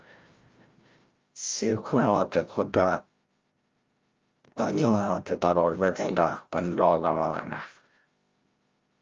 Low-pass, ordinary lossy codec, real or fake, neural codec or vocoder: 7.2 kHz; Opus, 32 kbps; fake; codec, 16 kHz, 0.5 kbps, FreqCodec, larger model